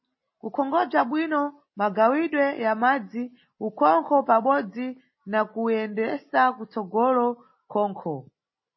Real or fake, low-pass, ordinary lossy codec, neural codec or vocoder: real; 7.2 kHz; MP3, 24 kbps; none